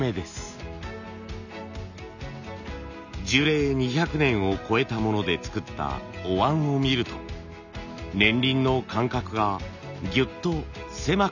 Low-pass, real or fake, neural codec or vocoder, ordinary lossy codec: 7.2 kHz; real; none; none